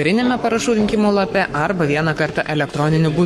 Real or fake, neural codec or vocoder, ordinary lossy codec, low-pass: fake; codec, 44.1 kHz, 7.8 kbps, Pupu-Codec; MP3, 64 kbps; 19.8 kHz